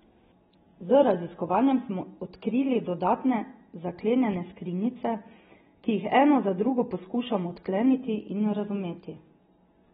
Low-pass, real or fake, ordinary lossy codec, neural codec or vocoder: 7.2 kHz; real; AAC, 16 kbps; none